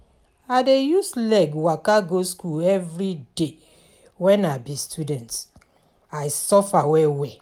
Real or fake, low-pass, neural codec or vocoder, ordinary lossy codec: real; 19.8 kHz; none; none